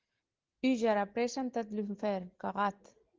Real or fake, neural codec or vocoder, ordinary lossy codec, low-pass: real; none; Opus, 16 kbps; 7.2 kHz